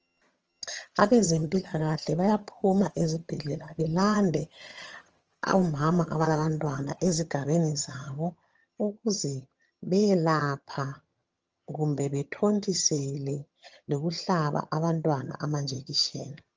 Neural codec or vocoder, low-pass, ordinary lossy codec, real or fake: vocoder, 22.05 kHz, 80 mel bands, HiFi-GAN; 7.2 kHz; Opus, 24 kbps; fake